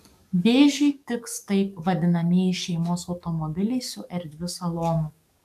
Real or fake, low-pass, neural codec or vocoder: fake; 14.4 kHz; codec, 44.1 kHz, 7.8 kbps, DAC